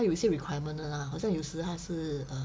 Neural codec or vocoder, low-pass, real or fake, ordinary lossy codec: none; none; real; none